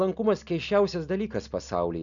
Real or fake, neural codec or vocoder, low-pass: real; none; 7.2 kHz